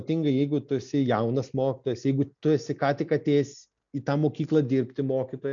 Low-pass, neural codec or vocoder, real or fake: 7.2 kHz; none; real